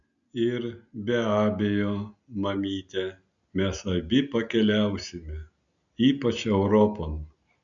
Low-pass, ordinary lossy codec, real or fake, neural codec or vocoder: 7.2 kHz; MP3, 96 kbps; real; none